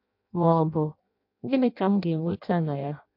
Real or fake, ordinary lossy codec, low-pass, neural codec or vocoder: fake; MP3, 48 kbps; 5.4 kHz; codec, 16 kHz in and 24 kHz out, 0.6 kbps, FireRedTTS-2 codec